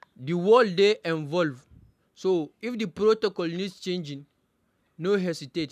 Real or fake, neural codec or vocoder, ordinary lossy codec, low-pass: real; none; none; 14.4 kHz